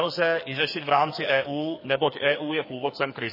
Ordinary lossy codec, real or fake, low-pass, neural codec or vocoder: MP3, 24 kbps; fake; 5.4 kHz; codec, 44.1 kHz, 2.6 kbps, SNAC